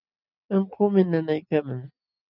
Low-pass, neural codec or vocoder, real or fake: 5.4 kHz; none; real